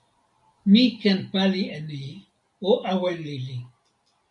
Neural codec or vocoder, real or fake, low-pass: none; real; 10.8 kHz